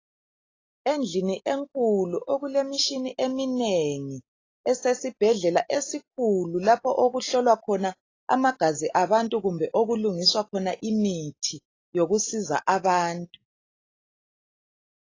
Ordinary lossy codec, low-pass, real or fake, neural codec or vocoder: AAC, 32 kbps; 7.2 kHz; real; none